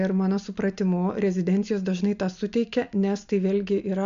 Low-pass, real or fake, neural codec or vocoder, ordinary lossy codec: 7.2 kHz; real; none; MP3, 64 kbps